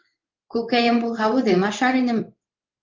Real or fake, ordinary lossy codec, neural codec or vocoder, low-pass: fake; Opus, 24 kbps; codec, 16 kHz in and 24 kHz out, 1 kbps, XY-Tokenizer; 7.2 kHz